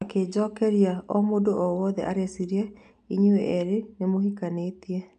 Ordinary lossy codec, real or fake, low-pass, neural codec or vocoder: none; real; 9.9 kHz; none